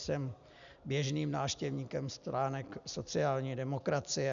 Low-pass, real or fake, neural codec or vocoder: 7.2 kHz; real; none